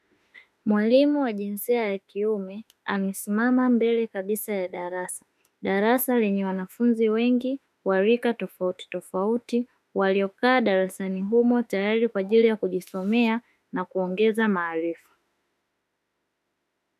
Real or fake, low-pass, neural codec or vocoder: fake; 14.4 kHz; autoencoder, 48 kHz, 32 numbers a frame, DAC-VAE, trained on Japanese speech